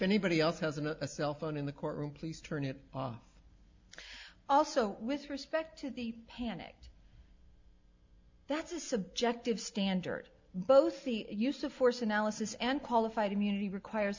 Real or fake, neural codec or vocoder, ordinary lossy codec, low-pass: real; none; MP3, 64 kbps; 7.2 kHz